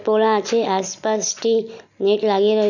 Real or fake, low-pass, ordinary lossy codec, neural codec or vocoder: real; 7.2 kHz; none; none